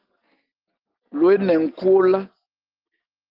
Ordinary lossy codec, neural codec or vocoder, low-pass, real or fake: Opus, 24 kbps; none; 5.4 kHz; real